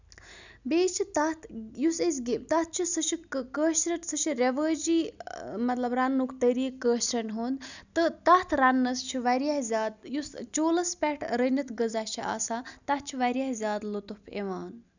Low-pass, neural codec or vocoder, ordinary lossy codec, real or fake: 7.2 kHz; none; none; real